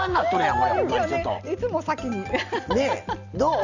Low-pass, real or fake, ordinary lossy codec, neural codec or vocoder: 7.2 kHz; real; none; none